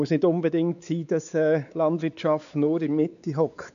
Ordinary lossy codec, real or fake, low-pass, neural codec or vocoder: none; fake; 7.2 kHz; codec, 16 kHz, 4 kbps, X-Codec, WavLM features, trained on Multilingual LibriSpeech